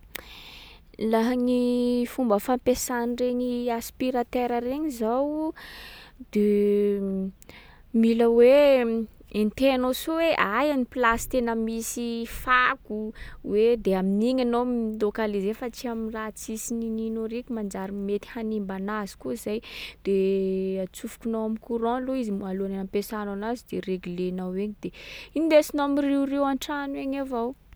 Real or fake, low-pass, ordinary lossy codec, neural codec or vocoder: real; none; none; none